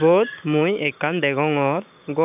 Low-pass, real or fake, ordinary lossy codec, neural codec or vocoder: 3.6 kHz; fake; none; codec, 16 kHz, 6 kbps, DAC